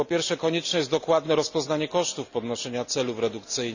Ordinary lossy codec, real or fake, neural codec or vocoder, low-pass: none; real; none; 7.2 kHz